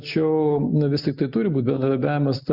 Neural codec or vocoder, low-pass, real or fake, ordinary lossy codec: none; 5.4 kHz; real; Opus, 64 kbps